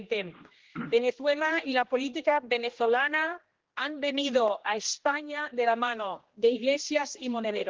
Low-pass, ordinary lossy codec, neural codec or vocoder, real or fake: 7.2 kHz; Opus, 16 kbps; codec, 16 kHz, 1 kbps, X-Codec, HuBERT features, trained on general audio; fake